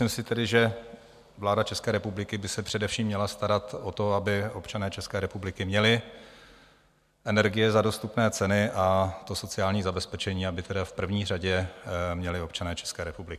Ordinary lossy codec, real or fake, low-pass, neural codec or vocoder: MP3, 96 kbps; real; 14.4 kHz; none